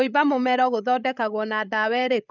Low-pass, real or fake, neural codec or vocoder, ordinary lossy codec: 7.2 kHz; fake; codec, 16 kHz, 16 kbps, FreqCodec, larger model; none